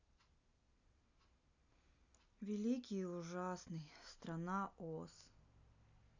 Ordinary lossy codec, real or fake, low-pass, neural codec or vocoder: none; real; 7.2 kHz; none